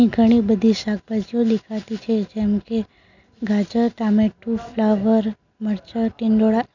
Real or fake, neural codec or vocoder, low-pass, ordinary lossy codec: real; none; 7.2 kHz; none